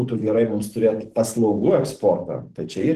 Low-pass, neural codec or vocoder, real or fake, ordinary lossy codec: 14.4 kHz; codec, 44.1 kHz, 7.8 kbps, DAC; fake; Opus, 16 kbps